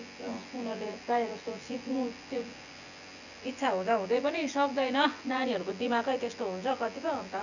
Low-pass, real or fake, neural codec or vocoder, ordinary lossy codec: 7.2 kHz; fake; vocoder, 24 kHz, 100 mel bands, Vocos; none